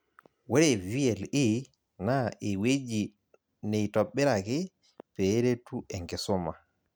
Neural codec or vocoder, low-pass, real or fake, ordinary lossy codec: none; none; real; none